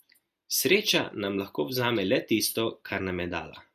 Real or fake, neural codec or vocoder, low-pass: real; none; 14.4 kHz